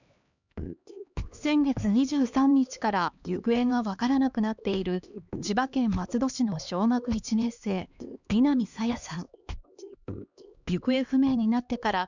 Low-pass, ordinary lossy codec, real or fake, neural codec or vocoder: 7.2 kHz; none; fake; codec, 16 kHz, 2 kbps, X-Codec, HuBERT features, trained on LibriSpeech